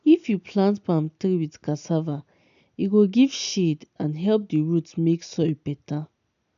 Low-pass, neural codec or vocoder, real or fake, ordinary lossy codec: 7.2 kHz; none; real; none